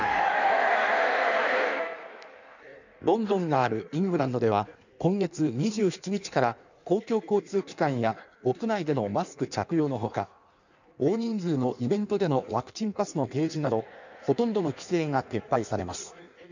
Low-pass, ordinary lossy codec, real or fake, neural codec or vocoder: 7.2 kHz; none; fake; codec, 16 kHz in and 24 kHz out, 1.1 kbps, FireRedTTS-2 codec